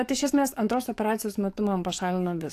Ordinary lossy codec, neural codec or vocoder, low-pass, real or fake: AAC, 64 kbps; codec, 44.1 kHz, 7.8 kbps, DAC; 14.4 kHz; fake